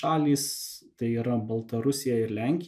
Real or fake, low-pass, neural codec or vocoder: real; 14.4 kHz; none